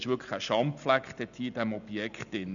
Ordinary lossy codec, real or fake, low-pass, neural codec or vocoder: none; real; 7.2 kHz; none